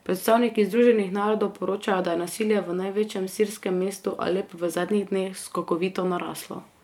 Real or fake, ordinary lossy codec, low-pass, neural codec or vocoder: fake; MP3, 96 kbps; 19.8 kHz; vocoder, 44.1 kHz, 128 mel bands every 512 samples, BigVGAN v2